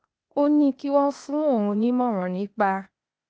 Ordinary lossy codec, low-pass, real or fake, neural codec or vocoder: none; none; fake; codec, 16 kHz, 0.8 kbps, ZipCodec